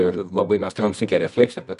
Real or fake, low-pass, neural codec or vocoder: fake; 10.8 kHz; codec, 24 kHz, 0.9 kbps, WavTokenizer, medium music audio release